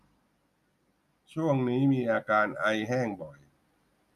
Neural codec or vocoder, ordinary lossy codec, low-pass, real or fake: none; none; 14.4 kHz; real